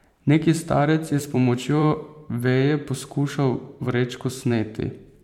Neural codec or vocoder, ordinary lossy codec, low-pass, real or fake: vocoder, 44.1 kHz, 128 mel bands every 512 samples, BigVGAN v2; MP3, 96 kbps; 19.8 kHz; fake